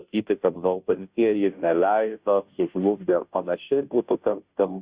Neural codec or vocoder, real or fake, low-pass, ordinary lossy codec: codec, 16 kHz, 0.5 kbps, FunCodec, trained on Chinese and English, 25 frames a second; fake; 3.6 kHz; Opus, 64 kbps